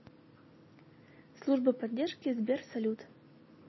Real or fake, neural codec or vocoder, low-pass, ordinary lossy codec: real; none; 7.2 kHz; MP3, 24 kbps